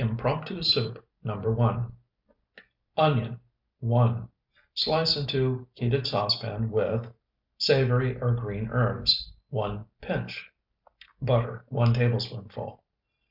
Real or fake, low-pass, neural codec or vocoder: real; 5.4 kHz; none